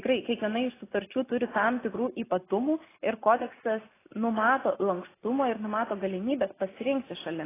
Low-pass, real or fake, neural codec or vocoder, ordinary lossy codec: 3.6 kHz; real; none; AAC, 16 kbps